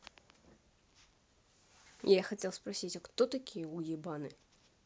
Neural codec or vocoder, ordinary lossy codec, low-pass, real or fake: none; none; none; real